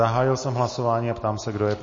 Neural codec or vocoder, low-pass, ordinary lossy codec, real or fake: none; 7.2 kHz; MP3, 32 kbps; real